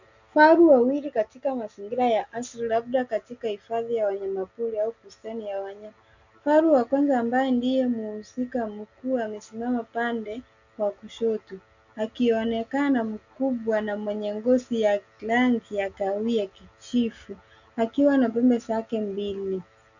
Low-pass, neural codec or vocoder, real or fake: 7.2 kHz; none; real